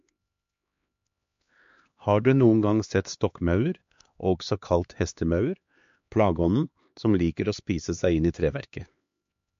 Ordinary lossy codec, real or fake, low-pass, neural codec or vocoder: MP3, 48 kbps; fake; 7.2 kHz; codec, 16 kHz, 2 kbps, X-Codec, HuBERT features, trained on LibriSpeech